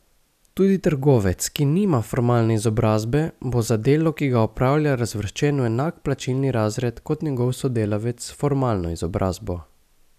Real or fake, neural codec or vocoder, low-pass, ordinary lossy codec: real; none; 14.4 kHz; none